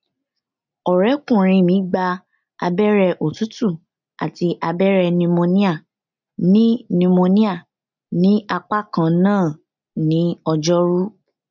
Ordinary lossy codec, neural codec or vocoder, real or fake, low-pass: none; none; real; 7.2 kHz